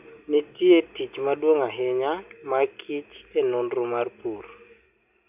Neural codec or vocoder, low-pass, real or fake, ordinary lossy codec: none; 3.6 kHz; real; none